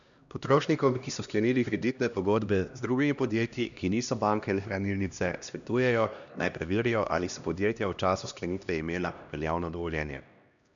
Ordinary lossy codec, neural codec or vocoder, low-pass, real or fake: none; codec, 16 kHz, 1 kbps, X-Codec, HuBERT features, trained on LibriSpeech; 7.2 kHz; fake